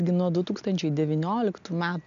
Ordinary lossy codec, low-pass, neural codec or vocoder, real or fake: AAC, 64 kbps; 7.2 kHz; none; real